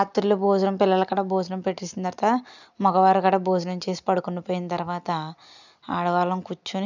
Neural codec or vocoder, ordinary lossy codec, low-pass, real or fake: none; none; 7.2 kHz; real